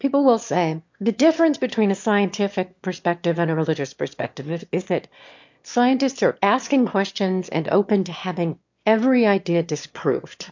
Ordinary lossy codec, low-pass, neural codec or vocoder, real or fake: MP3, 48 kbps; 7.2 kHz; autoencoder, 22.05 kHz, a latent of 192 numbers a frame, VITS, trained on one speaker; fake